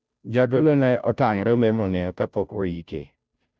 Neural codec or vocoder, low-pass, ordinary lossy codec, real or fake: codec, 16 kHz, 0.5 kbps, FunCodec, trained on Chinese and English, 25 frames a second; none; none; fake